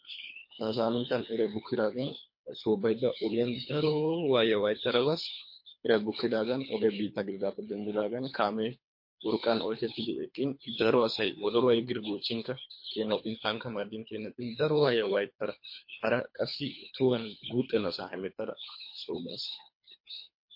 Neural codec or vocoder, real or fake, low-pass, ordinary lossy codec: codec, 24 kHz, 3 kbps, HILCodec; fake; 5.4 kHz; MP3, 32 kbps